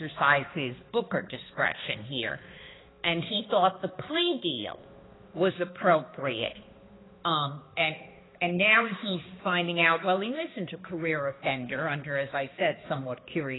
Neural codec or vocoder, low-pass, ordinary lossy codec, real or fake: codec, 16 kHz, 2 kbps, X-Codec, HuBERT features, trained on balanced general audio; 7.2 kHz; AAC, 16 kbps; fake